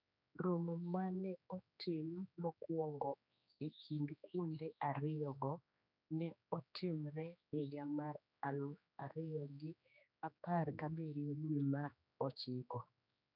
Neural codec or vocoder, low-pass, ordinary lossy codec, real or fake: codec, 16 kHz, 2 kbps, X-Codec, HuBERT features, trained on general audio; 5.4 kHz; none; fake